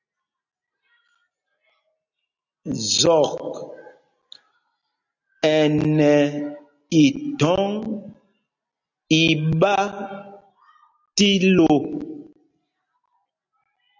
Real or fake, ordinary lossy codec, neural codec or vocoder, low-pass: real; AAC, 48 kbps; none; 7.2 kHz